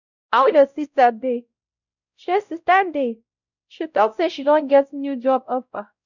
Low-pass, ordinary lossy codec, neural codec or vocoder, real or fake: 7.2 kHz; none; codec, 16 kHz, 0.5 kbps, X-Codec, WavLM features, trained on Multilingual LibriSpeech; fake